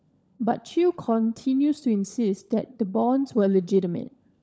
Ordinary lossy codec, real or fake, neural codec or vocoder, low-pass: none; fake; codec, 16 kHz, 16 kbps, FunCodec, trained on LibriTTS, 50 frames a second; none